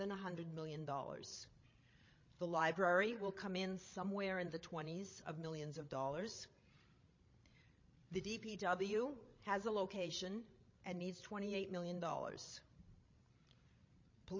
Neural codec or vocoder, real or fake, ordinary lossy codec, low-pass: codec, 16 kHz, 16 kbps, FreqCodec, larger model; fake; MP3, 32 kbps; 7.2 kHz